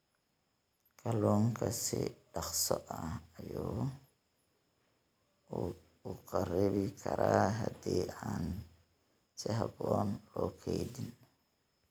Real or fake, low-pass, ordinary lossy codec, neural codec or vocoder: real; none; none; none